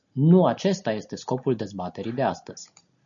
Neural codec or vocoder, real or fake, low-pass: none; real; 7.2 kHz